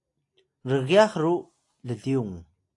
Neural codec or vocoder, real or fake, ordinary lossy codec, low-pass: none; real; AAC, 48 kbps; 10.8 kHz